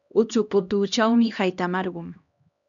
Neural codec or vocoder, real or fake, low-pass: codec, 16 kHz, 1 kbps, X-Codec, HuBERT features, trained on LibriSpeech; fake; 7.2 kHz